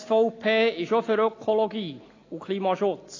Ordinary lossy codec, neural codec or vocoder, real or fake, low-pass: AAC, 32 kbps; none; real; 7.2 kHz